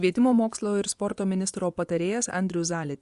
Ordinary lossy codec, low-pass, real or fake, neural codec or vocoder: Opus, 64 kbps; 10.8 kHz; fake; vocoder, 24 kHz, 100 mel bands, Vocos